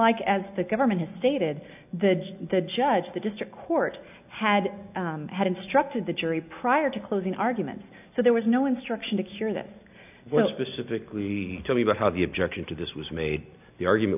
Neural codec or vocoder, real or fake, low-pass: none; real; 3.6 kHz